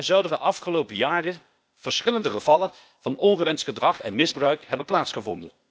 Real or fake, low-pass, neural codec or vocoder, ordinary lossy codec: fake; none; codec, 16 kHz, 0.8 kbps, ZipCodec; none